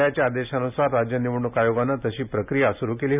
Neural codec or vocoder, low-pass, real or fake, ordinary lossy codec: none; 3.6 kHz; real; none